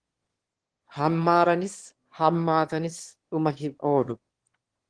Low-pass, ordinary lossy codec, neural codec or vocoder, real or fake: 9.9 kHz; Opus, 16 kbps; autoencoder, 22.05 kHz, a latent of 192 numbers a frame, VITS, trained on one speaker; fake